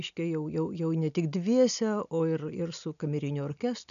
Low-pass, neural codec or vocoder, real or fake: 7.2 kHz; none; real